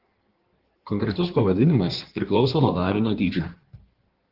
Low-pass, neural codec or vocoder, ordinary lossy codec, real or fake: 5.4 kHz; codec, 16 kHz in and 24 kHz out, 1.1 kbps, FireRedTTS-2 codec; Opus, 16 kbps; fake